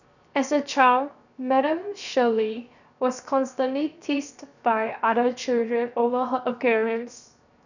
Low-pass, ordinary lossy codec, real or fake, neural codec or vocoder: 7.2 kHz; none; fake; codec, 16 kHz, 0.7 kbps, FocalCodec